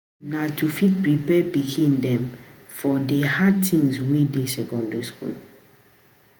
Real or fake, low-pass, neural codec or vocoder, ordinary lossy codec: fake; none; vocoder, 48 kHz, 128 mel bands, Vocos; none